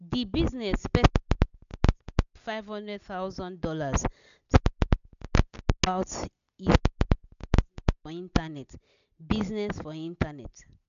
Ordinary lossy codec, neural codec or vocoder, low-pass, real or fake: none; none; 7.2 kHz; real